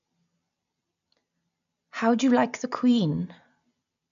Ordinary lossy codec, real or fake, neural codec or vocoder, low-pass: none; real; none; 7.2 kHz